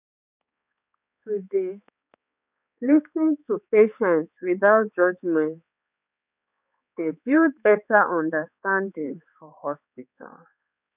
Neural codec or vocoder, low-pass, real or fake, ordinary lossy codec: codec, 16 kHz, 4 kbps, X-Codec, HuBERT features, trained on balanced general audio; 3.6 kHz; fake; none